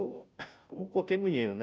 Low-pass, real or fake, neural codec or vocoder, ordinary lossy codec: none; fake; codec, 16 kHz, 0.5 kbps, FunCodec, trained on Chinese and English, 25 frames a second; none